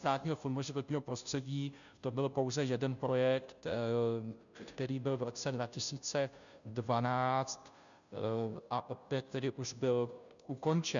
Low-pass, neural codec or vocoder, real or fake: 7.2 kHz; codec, 16 kHz, 0.5 kbps, FunCodec, trained on Chinese and English, 25 frames a second; fake